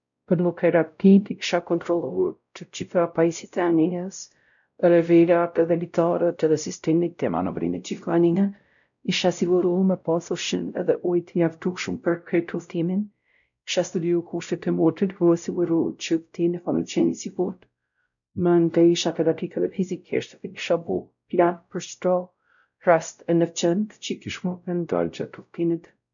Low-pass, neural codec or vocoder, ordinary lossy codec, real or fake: 7.2 kHz; codec, 16 kHz, 0.5 kbps, X-Codec, WavLM features, trained on Multilingual LibriSpeech; none; fake